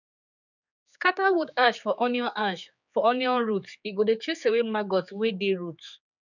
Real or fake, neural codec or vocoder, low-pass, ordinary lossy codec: fake; codec, 16 kHz, 4 kbps, X-Codec, HuBERT features, trained on general audio; 7.2 kHz; none